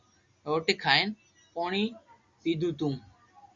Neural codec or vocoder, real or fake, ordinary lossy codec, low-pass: none; real; Opus, 64 kbps; 7.2 kHz